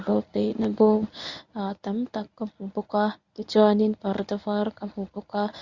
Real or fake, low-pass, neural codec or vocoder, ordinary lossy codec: fake; 7.2 kHz; codec, 24 kHz, 0.9 kbps, WavTokenizer, medium speech release version 1; Opus, 64 kbps